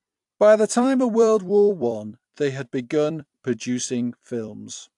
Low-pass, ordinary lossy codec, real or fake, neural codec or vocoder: 10.8 kHz; AAC, 64 kbps; fake; vocoder, 44.1 kHz, 128 mel bands every 256 samples, BigVGAN v2